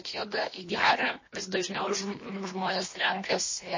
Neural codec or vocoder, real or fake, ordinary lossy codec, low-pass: codec, 24 kHz, 1.5 kbps, HILCodec; fake; MP3, 32 kbps; 7.2 kHz